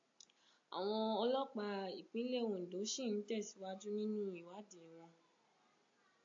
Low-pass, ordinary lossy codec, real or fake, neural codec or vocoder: 7.2 kHz; AAC, 48 kbps; real; none